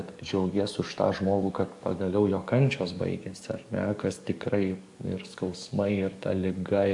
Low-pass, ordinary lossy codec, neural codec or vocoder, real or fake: 10.8 kHz; AAC, 64 kbps; codec, 44.1 kHz, 7.8 kbps, DAC; fake